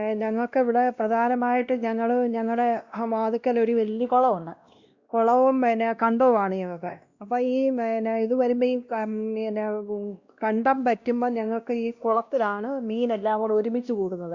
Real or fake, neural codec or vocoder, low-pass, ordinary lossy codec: fake; codec, 16 kHz, 1 kbps, X-Codec, WavLM features, trained on Multilingual LibriSpeech; 7.2 kHz; Opus, 64 kbps